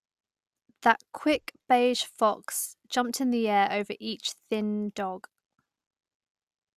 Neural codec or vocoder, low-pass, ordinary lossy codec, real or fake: none; 14.4 kHz; Opus, 64 kbps; real